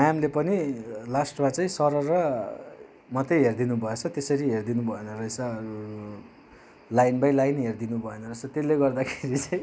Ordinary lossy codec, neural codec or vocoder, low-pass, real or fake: none; none; none; real